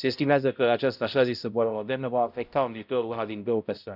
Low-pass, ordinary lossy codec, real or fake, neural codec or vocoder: 5.4 kHz; none; fake; codec, 16 kHz, 0.5 kbps, X-Codec, HuBERT features, trained on balanced general audio